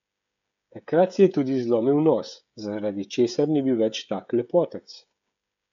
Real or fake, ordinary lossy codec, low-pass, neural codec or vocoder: fake; none; 7.2 kHz; codec, 16 kHz, 16 kbps, FreqCodec, smaller model